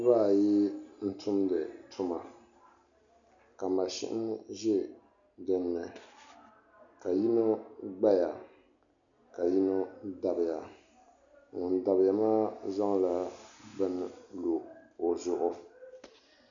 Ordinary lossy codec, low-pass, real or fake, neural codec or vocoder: MP3, 96 kbps; 7.2 kHz; real; none